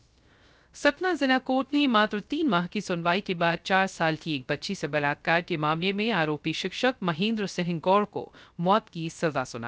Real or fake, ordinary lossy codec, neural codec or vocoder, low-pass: fake; none; codec, 16 kHz, 0.3 kbps, FocalCodec; none